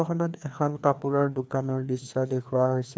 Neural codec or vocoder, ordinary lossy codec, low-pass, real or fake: codec, 16 kHz, 2 kbps, FreqCodec, larger model; none; none; fake